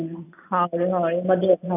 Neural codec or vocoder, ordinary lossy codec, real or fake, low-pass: none; none; real; 3.6 kHz